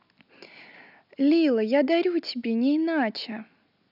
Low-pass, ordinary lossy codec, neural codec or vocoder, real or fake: 5.4 kHz; none; none; real